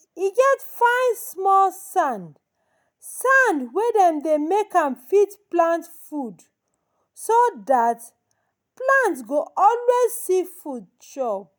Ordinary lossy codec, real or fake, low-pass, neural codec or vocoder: none; real; none; none